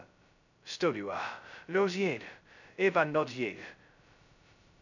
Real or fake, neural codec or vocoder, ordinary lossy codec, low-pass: fake; codec, 16 kHz, 0.2 kbps, FocalCodec; none; 7.2 kHz